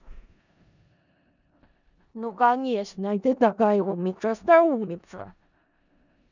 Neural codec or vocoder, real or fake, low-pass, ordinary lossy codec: codec, 16 kHz in and 24 kHz out, 0.4 kbps, LongCat-Audio-Codec, four codebook decoder; fake; 7.2 kHz; none